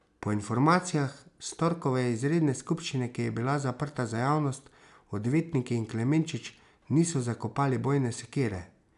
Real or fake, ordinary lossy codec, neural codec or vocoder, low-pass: real; none; none; 10.8 kHz